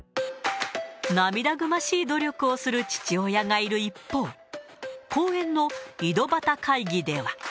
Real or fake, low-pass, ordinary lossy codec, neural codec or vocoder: real; none; none; none